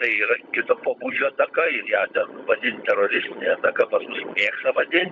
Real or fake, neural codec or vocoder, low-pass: fake; codec, 16 kHz, 16 kbps, FunCodec, trained on LibriTTS, 50 frames a second; 7.2 kHz